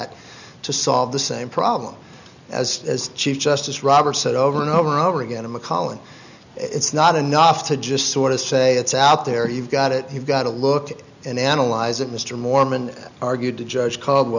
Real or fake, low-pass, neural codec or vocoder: real; 7.2 kHz; none